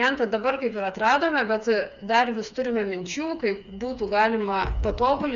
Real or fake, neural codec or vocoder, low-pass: fake; codec, 16 kHz, 4 kbps, FreqCodec, smaller model; 7.2 kHz